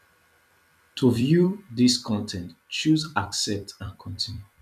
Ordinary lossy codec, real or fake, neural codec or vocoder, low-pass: none; fake; vocoder, 44.1 kHz, 128 mel bands every 256 samples, BigVGAN v2; 14.4 kHz